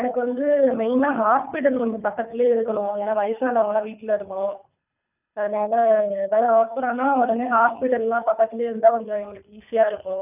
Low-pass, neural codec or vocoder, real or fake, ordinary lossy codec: 3.6 kHz; codec, 24 kHz, 3 kbps, HILCodec; fake; none